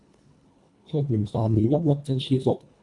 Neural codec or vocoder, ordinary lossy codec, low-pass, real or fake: codec, 24 kHz, 1.5 kbps, HILCodec; AAC, 64 kbps; 10.8 kHz; fake